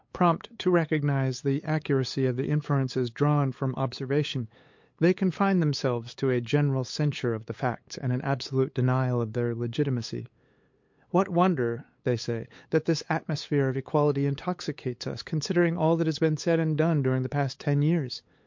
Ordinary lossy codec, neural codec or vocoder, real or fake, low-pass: MP3, 48 kbps; codec, 16 kHz, 8 kbps, FunCodec, trained on LibriTTS, 25 frames a second; fake; 7.2 kHz